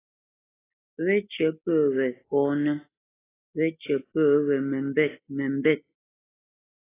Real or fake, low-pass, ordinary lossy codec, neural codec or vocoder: real; 3.6 kHz; AAC, 16 kbps; none